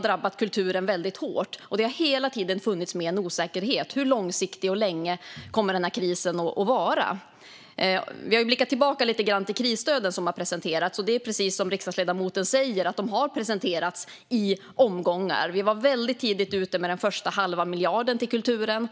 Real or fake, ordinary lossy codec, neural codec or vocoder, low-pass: real; none; none; none